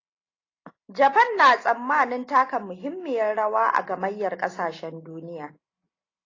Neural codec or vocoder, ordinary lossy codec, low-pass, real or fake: none; AAC, 32 kbps; 7.2 kHz; real